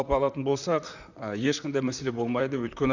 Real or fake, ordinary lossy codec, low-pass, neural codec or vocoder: fake; none; 7.2 kHz; vocoder, 44.1 kHz, 128 mel bands, Pupu-Vocoder